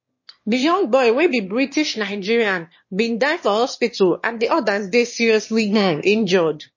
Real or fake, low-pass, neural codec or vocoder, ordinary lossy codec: fake; 7.2 kHz; autoencoder, 22.05 kHz, a latent of 192 numbers a frame, VITS, trained on one speaker; MP3, 32 kbps